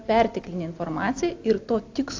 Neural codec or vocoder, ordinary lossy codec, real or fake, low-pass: none; AAC, 48 kbps; real; 7.2 kHz